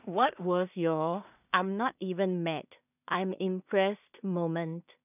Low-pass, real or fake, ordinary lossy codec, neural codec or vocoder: 3.6 kHz; fake; none; codec, 16 kHz in and 24 kHz out, 0.4 kbps, LongCat-Audio-Codec, two codebook decoder